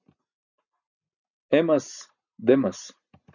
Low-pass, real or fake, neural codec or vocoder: 7.2 kHz; real; none